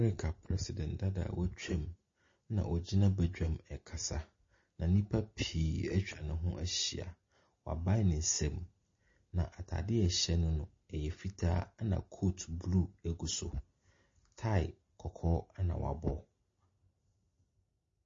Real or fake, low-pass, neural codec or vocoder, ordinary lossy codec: real; 7.2 kHz; none; MP3, 32 kbps